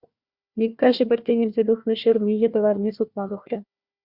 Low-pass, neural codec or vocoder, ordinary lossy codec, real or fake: 5.4 kHz; codec, 16 kHz, 1 kbps, FunCodec, trained on Chinese and English, 50 frames a second; Opus, 64 kbps; fake